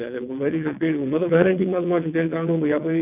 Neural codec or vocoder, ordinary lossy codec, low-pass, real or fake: vocoder, 22.05 kHz, 80 mel bands, WaveNeXt; none; 3.6 kHz; fake